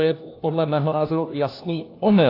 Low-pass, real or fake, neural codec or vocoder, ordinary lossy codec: 5.4 kHz; fake; codec, 16 kHz, 1 kbps, FunCodec, trained on LibriTTS, 50 frames a second; AAC, 32 kbps